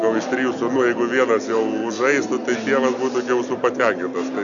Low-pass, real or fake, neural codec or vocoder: 7.2 kHz; real; none